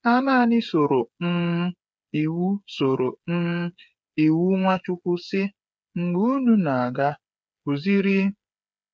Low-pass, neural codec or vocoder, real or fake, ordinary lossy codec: none; codec, 16 kHz, 8 kbps, FreqCodec, smaller model; fake; none